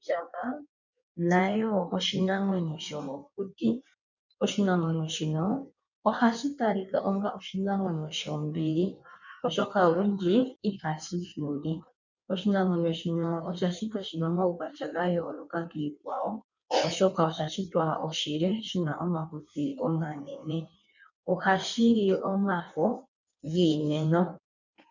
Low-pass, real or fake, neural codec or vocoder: 7.2 kHz; fake; codec, 16 kHz in and 24 kHz out, 1.1 kbps, FireRedTTS-2 codec